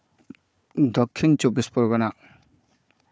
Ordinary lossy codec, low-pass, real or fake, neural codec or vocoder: none; none; fake; codec, 16 kHz, 4 kbps, FunCodec, trained on Chinese and English, 50 frames a second